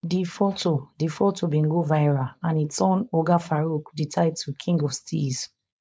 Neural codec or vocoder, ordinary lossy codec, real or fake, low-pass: codec, 16 kHz, 4.8 kbps, FACodec; none; fake; none